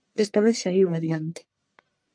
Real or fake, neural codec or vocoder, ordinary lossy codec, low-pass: fake; codec, 44.1 kHz, 1.7 kbps, Pupu-Codec; MP3, 64 kbps; 9.9 kHz